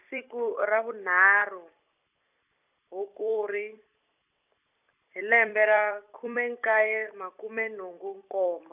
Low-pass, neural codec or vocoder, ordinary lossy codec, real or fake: 3.6 kHz; vocoder, 44.1 kHz, 128 mel bands every 256 samples, BigVGAN v2; none; fake